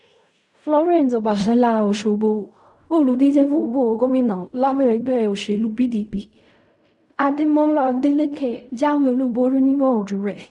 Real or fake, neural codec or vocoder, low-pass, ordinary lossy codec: fake; codec, 16 kHz in and 24 kHz out, 0.4 kbps, LongCat-Audio-Codec, fine tuned four codebook decoder; 10.8 kHz; none